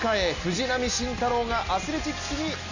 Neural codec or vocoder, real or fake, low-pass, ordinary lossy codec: none; real; 7.2 kHz; none